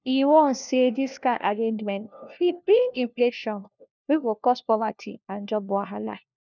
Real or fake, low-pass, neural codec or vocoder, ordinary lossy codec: fake; 7.2 kHz; codec, 16 kHz, 1 kbps, FunCodec, trained on LibriTTS, 50 frames a second; none